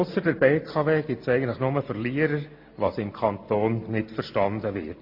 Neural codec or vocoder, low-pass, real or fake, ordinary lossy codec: none; 5.4 kHz; real; MP3, 32 kbps